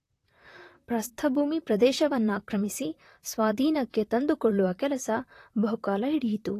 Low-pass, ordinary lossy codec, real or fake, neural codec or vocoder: 14.4 kHz; AAC, 48 kbps; fake; vocoder, 44.1 kHz, 128 mel bands, Pupu-Vocoder